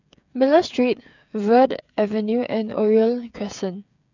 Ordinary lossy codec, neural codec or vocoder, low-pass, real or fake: none; codec, 16 kHz, 8 kbps, FreqCodec, smaller model; 7.2 kHz; fake